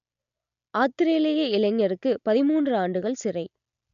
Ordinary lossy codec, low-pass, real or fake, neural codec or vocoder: MP3, 96 kbps; 7.2 kHz; real; none